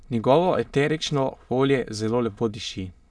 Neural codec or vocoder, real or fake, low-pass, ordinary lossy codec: autoencoder, 22.05 kHz, a latent of 192 numbers a frame, VITS, trained on many speakers; fake; none; none